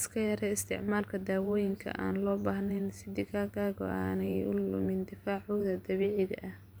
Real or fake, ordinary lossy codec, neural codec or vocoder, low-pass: fake; none; vocoder, 44.1 kHz, 128 mel bands every 512 samples, BigVGAN v2; none